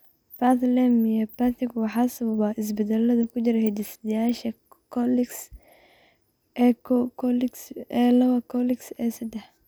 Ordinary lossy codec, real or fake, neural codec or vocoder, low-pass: none; real; none; none